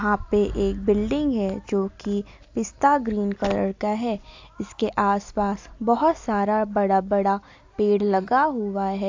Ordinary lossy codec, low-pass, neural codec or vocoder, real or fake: AAC, 48 kbps; 7.2 kHz; none; real